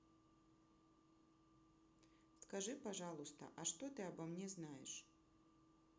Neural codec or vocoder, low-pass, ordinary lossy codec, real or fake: none; none; none; real